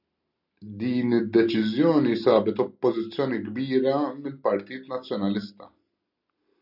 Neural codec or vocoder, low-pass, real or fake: none; 5.4 kHz; real